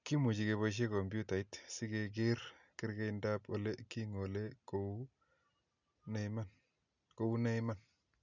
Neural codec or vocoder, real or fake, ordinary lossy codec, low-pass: none; real; none; 7.2 kHz